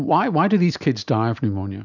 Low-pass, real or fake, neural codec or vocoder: 7.2 kHz; real; none